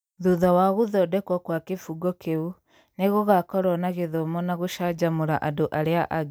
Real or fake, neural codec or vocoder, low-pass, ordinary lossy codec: real; none; none; none